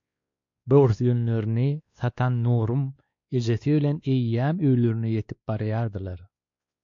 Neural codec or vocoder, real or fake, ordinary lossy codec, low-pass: codec, 16 kHz, 2 kbps, X-Codec, WavLM features, trained on Multilingual LibriSpeech; fake; MP3, 48 kbps; 7.2 kHz